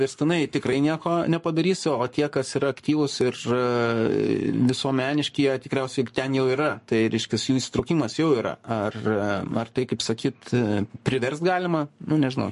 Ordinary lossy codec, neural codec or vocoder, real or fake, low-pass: MP3, 48 kbps; codec, 44.1 kHz, 7.8 kbps, Pupu-Codec; fake; 14.4 kHz